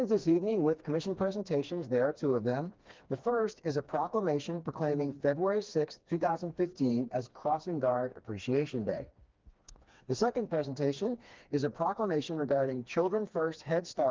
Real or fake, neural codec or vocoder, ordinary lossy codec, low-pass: fake; codec, 16 kHz, 2 kbps, FreqCodec, smaller model; Opus, 32 kbps; 7.2 kHz